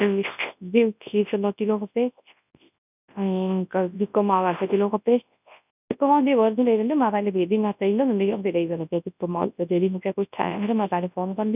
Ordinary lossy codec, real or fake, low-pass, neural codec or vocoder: none; fake; 3.6 kHz; codec, 24 kHz, 0.9 kbps, WavTokenizer, large speech release